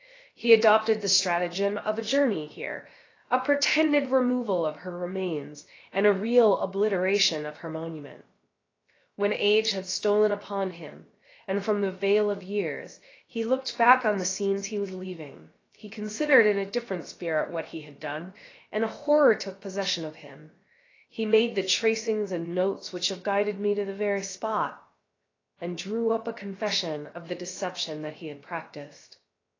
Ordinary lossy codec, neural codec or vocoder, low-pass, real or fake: AAC, 32 kbps; codec, 16 kHz, 0.7 kbps, FocalCodec; 7.2 kHz; fake